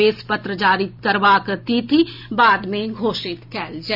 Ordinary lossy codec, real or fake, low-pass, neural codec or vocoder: none; real; 5.4 kHz; none